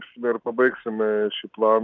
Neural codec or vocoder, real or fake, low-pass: none; real; 7.2 kHz